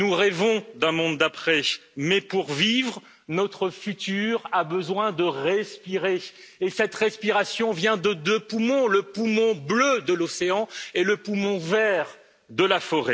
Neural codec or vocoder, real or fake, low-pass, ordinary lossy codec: none; real; none; none